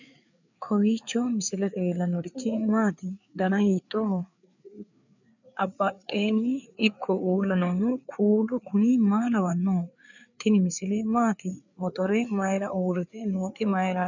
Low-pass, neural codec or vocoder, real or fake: 7.2 kHz; codec, 16 kHz, 4 kbps, FreqCodec, larger model; fake